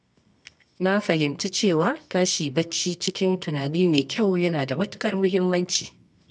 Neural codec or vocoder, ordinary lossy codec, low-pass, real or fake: codec, 24 kHz, 0.9 kbps, WavTokenizer, medium music audio release; none; none; fake